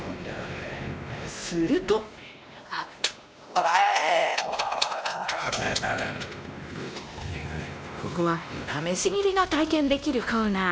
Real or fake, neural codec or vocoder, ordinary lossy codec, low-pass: fake; codec, 16 kHz, 1 kbps, X-Codec, WavLM features, trained on Multilingual LibriSpeech; none; none